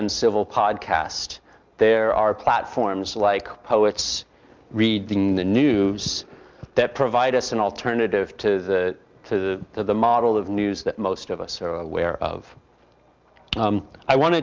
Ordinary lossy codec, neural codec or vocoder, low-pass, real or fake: Opus, 24 kbps; none; 7.2 kHz; real